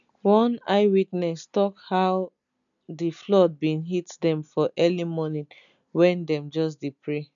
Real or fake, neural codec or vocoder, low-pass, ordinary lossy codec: real; none; 7.2 kHz; none